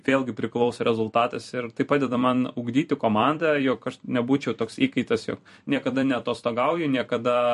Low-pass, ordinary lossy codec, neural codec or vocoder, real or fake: 14.4 kHz; MP3, 48 kbps; vocoder, 44.1 kHz, 128 mel bands every 256 samples, BigVGAN v2; fake